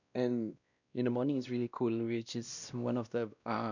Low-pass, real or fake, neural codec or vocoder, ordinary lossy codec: 7.2 kHz; fake; codec, 16 kHz, 1 kbps, X-Codec, WavLM features, trained on Multilingual LibriSpeech; none